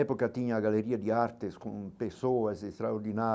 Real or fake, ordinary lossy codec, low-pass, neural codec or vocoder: real; none; none; none